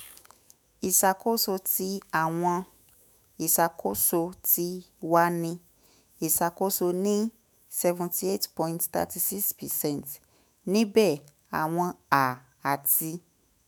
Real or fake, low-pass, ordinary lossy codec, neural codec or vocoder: fake; none; none; autoencoder, 48 kHz, 128 numbers a frame, DAC-VAE, trained on Japanese speech